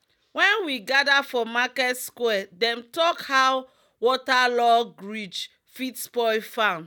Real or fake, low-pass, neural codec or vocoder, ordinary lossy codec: real; 19.8 kHz; none; none